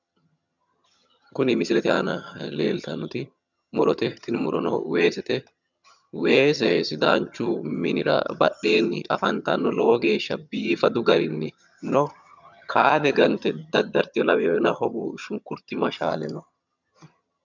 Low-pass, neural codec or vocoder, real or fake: 7.2 kHz; vocoder, 22.05 kHz, 80 mel bands, HiFi-GAN; fake